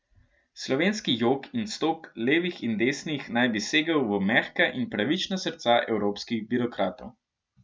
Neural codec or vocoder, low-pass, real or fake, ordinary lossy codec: none; none; real; none